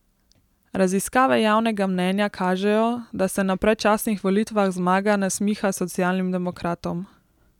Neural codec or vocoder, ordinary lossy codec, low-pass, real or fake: none; none; 19.8 kHz; real